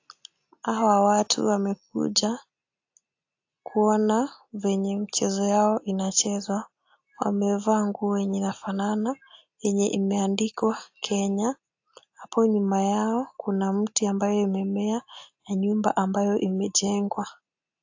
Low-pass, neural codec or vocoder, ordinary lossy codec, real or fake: 7.2 kHz; none; AAC, 48 kbps; real